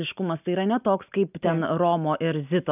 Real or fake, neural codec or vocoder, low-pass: real; none; 3.6 kHz